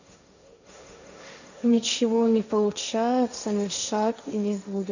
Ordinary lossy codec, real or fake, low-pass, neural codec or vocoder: none; fake; 7.2 kHz; codec, 16 kHz, 1.1 kbps, Voila-Tokenizer